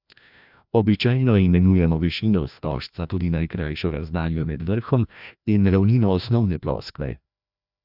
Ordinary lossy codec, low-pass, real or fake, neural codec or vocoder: none; 5.4 kHz; fake; codec, 16 kHz, 1 kbps, FreqCodec, larger model